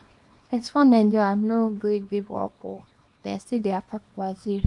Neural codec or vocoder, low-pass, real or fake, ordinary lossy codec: codec, 24 kHz, 0.9 kbps, WavTokenizer, small release; 10.8 kHz; fake; MP3, 64 kbps